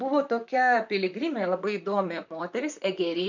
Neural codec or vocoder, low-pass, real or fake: vocoder, 44.1 kHz, 128 mel bands, Pupu-Vocoder; 7.2 kHz; fake